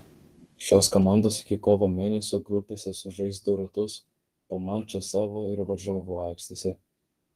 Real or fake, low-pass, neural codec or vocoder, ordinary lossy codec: fake; 14.4 kHz; codec, 32 kHz, 1.9 kbps, SNAC; Opus, 24 kbps